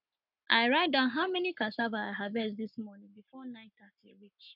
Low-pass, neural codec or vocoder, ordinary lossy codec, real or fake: 5.4 kHz; codec, 44.1 kHz, 7.8 kbps, Pupu-Codec; none; fake